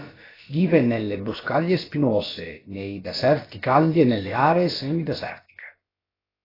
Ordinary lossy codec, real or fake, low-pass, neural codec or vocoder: AAC, 24 kbps; fake; 5.4 kHz; codec, 16 kHz, about 1 kbps, DyCAST, with the encoder's durations